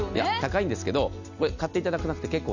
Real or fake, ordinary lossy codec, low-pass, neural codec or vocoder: real; none; 7.2 kHz; none